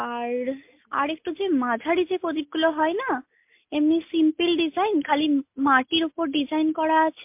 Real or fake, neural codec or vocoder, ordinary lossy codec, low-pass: real; none; MP3, 32 kbps; 3.6 kHz